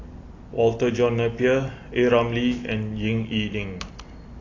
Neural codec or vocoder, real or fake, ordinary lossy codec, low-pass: none; real; AAC, 32 kbps; 7.2 kHz